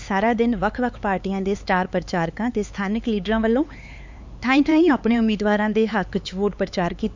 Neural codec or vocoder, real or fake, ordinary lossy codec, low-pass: codec, 16 kHz, 4 kbps, X-Codec, HuBERT features, trained on LibriSpeech; fake; MP3, 64 kbps; 7.2 kHz